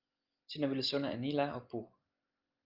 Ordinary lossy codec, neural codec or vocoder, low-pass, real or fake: Opus, 32 kbps; none; 5.4 kHz; real